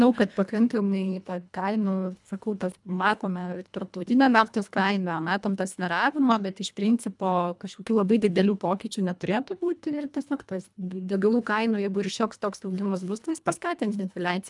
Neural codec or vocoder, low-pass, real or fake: codec, 24 kHz, 1.5 kbps, HILCodec; 10.8 kHz; fake